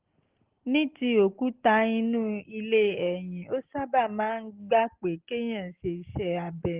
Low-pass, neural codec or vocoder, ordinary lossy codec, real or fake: 3.6 kHz; none; Opus, 16 kbps; real